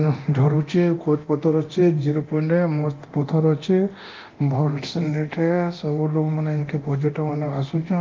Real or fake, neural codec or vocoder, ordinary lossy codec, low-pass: fake; codec, 24 kHz, 0.9 kbps, DualCodec; Opus, 32 kbps; 7.2 kHz